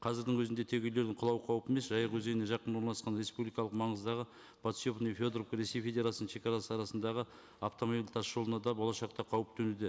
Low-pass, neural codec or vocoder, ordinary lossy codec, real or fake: none; none; none; real